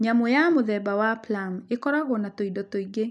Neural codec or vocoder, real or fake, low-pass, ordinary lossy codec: none; real; none; none